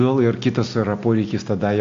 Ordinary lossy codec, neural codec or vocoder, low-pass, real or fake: AAC, 48 kbps; none; 7.2 kHz; real